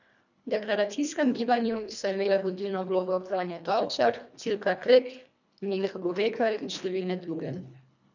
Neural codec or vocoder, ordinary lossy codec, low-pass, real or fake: codec, 24 kHz, 1.5 kbps, HILCodec; none; 7.2 kHz; fake